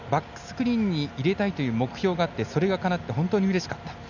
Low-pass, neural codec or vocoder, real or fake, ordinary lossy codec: 7.2 kHz; none; real; none